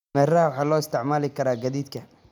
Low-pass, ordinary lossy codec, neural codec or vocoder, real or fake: 19.8 kHz; none; none; real